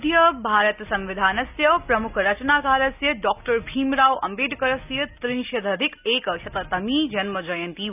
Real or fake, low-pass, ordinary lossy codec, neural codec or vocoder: real; 3.6 kHz; none; none